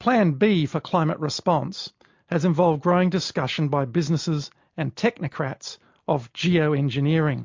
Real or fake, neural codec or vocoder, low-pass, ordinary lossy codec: real; none; 7.2 kHz; MP3, 48 kbps